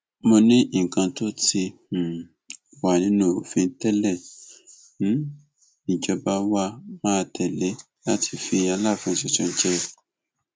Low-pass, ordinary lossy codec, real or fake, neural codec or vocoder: none; none; real; none